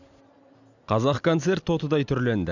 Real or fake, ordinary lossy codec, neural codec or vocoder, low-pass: real; none; none; 7.2 kHz